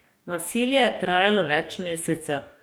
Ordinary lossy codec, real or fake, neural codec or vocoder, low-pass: none; fake; codec, 44.1 kHz, 2.6 kbps, DAC; none